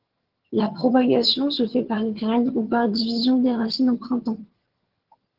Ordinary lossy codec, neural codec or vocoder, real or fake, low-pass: Opus, 16 kbps; vocoder, 22.05 kHz, 80 mel bands, HiFi-GAN; fake; 5.4 kHz